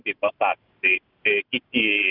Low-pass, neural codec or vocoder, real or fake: 5.4 kHz; none; real